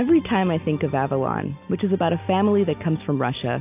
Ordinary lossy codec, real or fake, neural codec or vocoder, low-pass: AAC, 32 kbps; real; none; 3.6 kHz